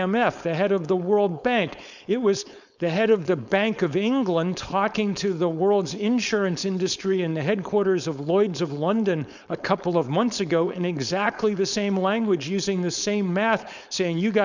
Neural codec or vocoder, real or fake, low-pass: codec, 16 kHz, 4.8 kbps, FACodec; fake; 7.2 kHz